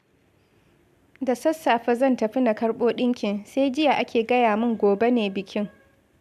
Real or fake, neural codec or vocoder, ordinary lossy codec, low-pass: real; none; AAC, 96 kbps; 14.4 kHz